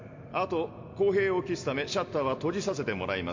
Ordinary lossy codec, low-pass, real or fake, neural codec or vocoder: MP3, 48 kbps; 7.2 kHz; real; none